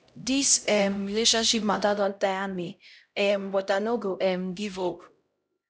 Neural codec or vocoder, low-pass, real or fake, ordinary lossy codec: codec, 16 kHz, 0.5 kbps, X-Codec, HuBERT features, trained on LibriSpeech; none; fake; none